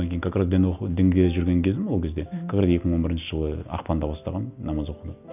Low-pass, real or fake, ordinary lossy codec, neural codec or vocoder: 3.6 kHz; real; none; none